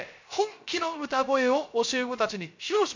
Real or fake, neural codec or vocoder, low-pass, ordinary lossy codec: fake; codec, 16 kHz, 0.3 kbps, FocalCodec; 7.2 kHz; MP3, 48 kbps